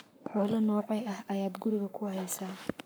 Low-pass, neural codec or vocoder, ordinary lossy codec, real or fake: none; codec, 44.1 kHz, 7.8 kbps, Pupu-Codec; none; fake